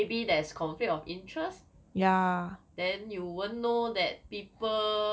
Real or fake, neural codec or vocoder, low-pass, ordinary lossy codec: real; none; none; none